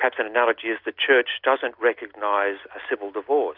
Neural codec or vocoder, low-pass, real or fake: none; 5.4 kHz; real